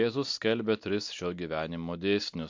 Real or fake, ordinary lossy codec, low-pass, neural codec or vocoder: real; MP3, 48 kbps; 7.2 kHz; none